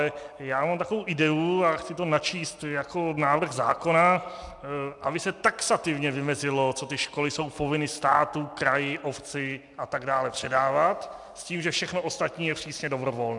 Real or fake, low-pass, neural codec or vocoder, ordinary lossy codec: real; 10.8 kHz; none; MP3, 96 kbps